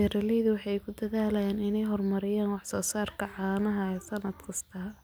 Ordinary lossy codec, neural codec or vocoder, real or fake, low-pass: none; none; real; none